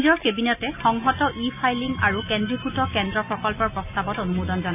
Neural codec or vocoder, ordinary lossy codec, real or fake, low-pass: none; AAC, 32 kbps; real; 3.6 kHz